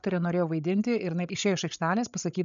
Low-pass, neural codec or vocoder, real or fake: 7.2 kHz; codec, 16 kHz, 16 kbps, FreqCodec, larger model; fake